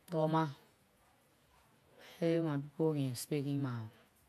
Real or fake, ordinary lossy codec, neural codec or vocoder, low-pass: fake; none; vocoder, 48 kHz, 128 mel bands, Vocos; 14.4 kHz